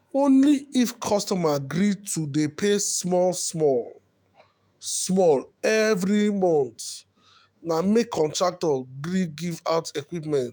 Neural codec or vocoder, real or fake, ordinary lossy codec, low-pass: autoencoder, 48 kHz, 128 numbers a frame, DAC-VAE, trained on Japanese speech; fake; none; none